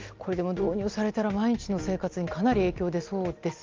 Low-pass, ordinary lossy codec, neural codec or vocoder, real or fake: 7.2 kHz; Opus, 32 kbps; none; real